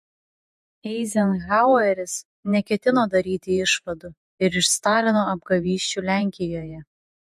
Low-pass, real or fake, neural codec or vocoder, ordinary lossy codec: 14.4 kHz; fake; vocoder, 48 kHz, 128 mel bands, Vocos; MP3, 64 kbps